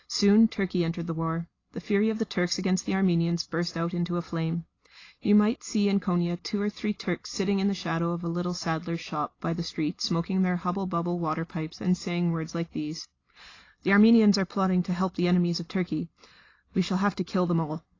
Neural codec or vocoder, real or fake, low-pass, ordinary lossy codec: none; real; 7.2 kHz; AAC, 32 kbps